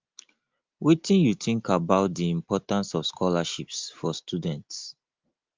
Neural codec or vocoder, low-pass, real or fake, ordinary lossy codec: none; 7.2 kHz; real; Opus, 32 kbps